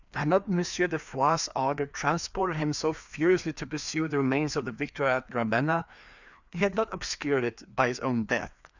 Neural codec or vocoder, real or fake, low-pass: codec, 16 kHz, 2 kbps, FreqCodec, larger model; fake; 7.2 kHz